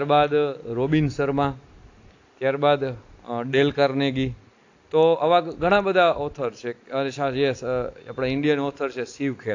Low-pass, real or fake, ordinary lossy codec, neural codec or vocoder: 7.2 kHz; real; AAC, 48 kbps; none